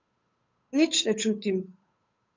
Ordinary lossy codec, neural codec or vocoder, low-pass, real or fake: MP3, 48 kbps; codec, 16 kHz, 8 kbps, FunCodec, trained on Chinese and English, 25 frames a second; 7.2 kHz; fake